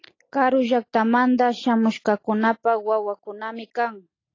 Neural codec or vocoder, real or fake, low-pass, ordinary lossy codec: none; real; 7.2 kHz; AAC, 32 kbps